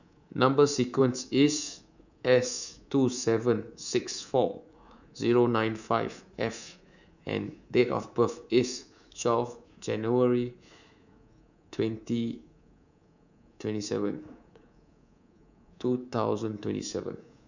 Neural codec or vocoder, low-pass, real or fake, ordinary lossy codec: codec, 24 kHz, 3.1 kbps, DualCodec; 7.2 kHz; fake; none